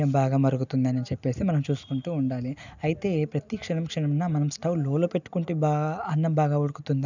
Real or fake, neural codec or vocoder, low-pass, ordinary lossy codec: real; none; 7.2 kHz; none